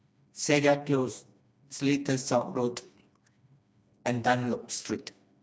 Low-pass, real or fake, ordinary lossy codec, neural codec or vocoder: none; fake; none; codec, 16 kHz, 2 kbps, FreqCodec, smaller model